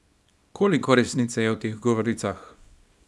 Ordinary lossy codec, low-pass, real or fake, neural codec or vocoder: none; none; fake; codec, 24 kHz, 0.9 kbps, WavTokenizer, small release